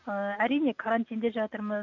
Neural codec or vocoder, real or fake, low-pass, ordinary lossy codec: none; real; 7.2 kHz; none